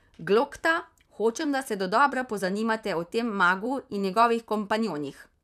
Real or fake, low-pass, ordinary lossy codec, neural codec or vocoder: fake; 14.4 kHz; none; vocoder, 44.1 kHz, 128 mel bands, Pupu-Vocoder